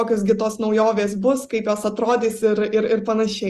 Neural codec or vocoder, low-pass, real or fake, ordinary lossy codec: none; 14.4 kHz; real; Opus, 32 kbps